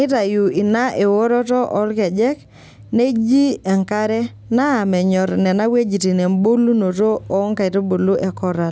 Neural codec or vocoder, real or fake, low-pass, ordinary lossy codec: none; real; none; none